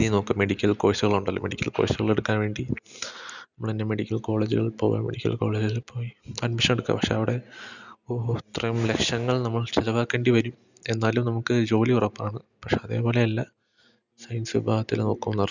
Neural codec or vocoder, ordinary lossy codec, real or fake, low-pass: none; none; real; 7.2 kHz